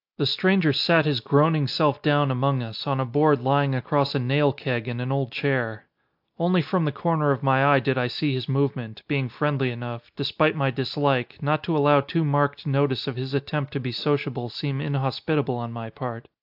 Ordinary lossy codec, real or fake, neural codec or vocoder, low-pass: AAC, 48 kbps; real; none; 5.4 kHz